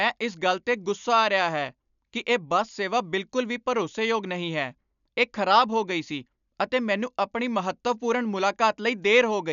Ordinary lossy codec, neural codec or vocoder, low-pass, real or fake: none; none; 7.2 kHz; real